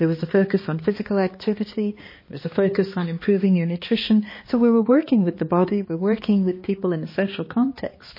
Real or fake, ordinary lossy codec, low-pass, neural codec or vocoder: fake; MP3, 24 kbps; 5.4 kHz; codec, 16 kHz, 2 kbps, X-Codec, HuBERT features, trained on balanced general audio